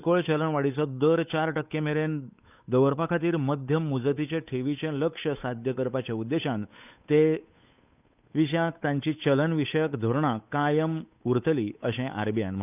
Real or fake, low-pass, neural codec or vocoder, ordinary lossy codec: fake; 3.6 kHz; codec, 16 kHz, 8 kbps, FunCodec, trained on Chinese and English, 25 frames a second; none